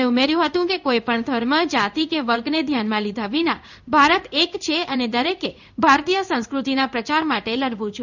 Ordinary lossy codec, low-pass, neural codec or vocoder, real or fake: none; 7.2 kHz; codec, 16 kHz in and 24 kHz out, 1 kbps, XY-Tokenizer; fake